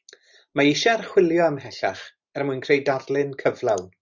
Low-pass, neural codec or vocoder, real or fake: 7.2 kHz; none; real